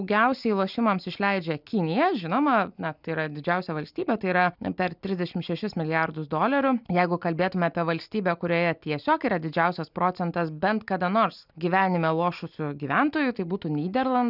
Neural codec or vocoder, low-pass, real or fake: none; 5.4 kHz; real